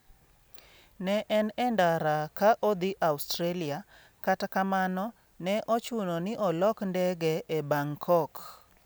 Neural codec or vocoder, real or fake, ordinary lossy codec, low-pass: none; real; none; none